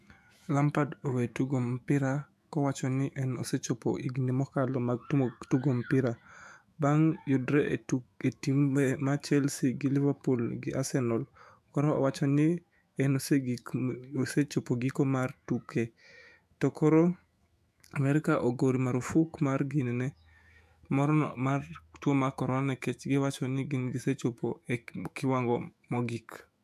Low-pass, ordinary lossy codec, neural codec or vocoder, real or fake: 14.4 kHz; AAC, 96 kbps; autoencoder, 48 kHz, 128 numbers a frame, DAC-VAE, trained on Japanese speech; fake